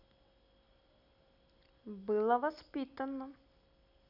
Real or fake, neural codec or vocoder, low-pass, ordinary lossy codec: real; none; 5.4 kHz; none